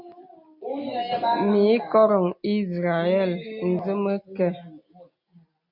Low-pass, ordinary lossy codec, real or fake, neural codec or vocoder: 5.4 kHz; MP3, 48 kbps; real; none